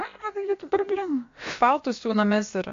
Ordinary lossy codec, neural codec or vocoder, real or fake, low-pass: MP3, 48 kbps; codec, 16 kHz, about 1 kbps, DyCAST, with the encoder's durations; fake; 7.2 kHz